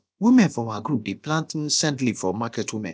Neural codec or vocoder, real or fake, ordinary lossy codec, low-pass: codec, 16 kHz, about 1 kbps, DyCAST, with the encoder's durations; fake; none; none